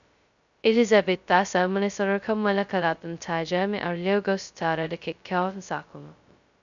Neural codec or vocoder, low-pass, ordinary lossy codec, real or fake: codec, 16 kHz, 0.2 kbps, FocalCodec; 7.2 kHz; none; fake